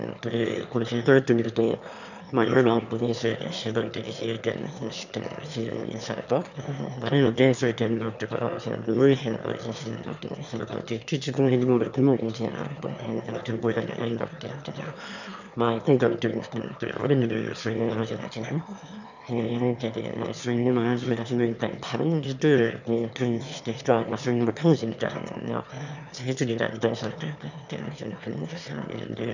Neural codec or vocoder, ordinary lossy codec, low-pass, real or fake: autoencoder, 22.05 kHz, a latent of 192 numbers a frame, VITS, trained on one speaker; none; 7.2 kHz; fake